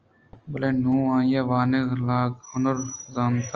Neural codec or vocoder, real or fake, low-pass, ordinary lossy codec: none; real; 7.2 kHz; Opus, 24 kbps